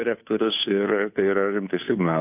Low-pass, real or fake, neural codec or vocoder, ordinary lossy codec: 3.6 kHz; fake; codec, 16 kHz, 2 kbps, FunCodec, trained on Chinese and English, 25 frames a second; AAC, 32 kbps